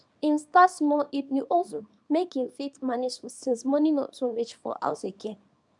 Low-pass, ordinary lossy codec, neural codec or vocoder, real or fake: 10.8 kHz; none; codec, 24 kHz, 0.9 kbps, WavTokenizer, small release; fake